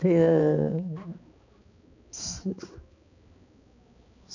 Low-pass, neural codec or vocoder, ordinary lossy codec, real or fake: 7.2 kHz; codec, 16 kHz, 2 kbps, X-Codec, HuBERT features, trained on balanced general audio; none; fake